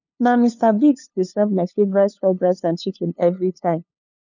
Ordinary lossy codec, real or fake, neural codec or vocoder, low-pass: none; fake; codec, 16 kHz, 2 kbps, FunCodec, trained on LibriTTS, 25 frames a second; 7.2 kHz